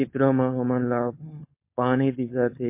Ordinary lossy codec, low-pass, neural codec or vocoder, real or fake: MP3, 24 kbps; 3.6 kHz; codec, 16 kHz, 4.8 kbps, FACodec; fake